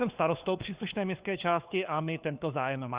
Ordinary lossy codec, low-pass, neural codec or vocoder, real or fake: Opus, 64 kbps; 3.6 kHz; codec, 16 kHz, 4 kbps, FunCodec, trained on LibriTTS, 50 frames a second; fake